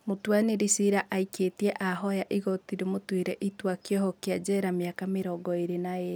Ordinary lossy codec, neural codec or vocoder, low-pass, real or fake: none; none; none; real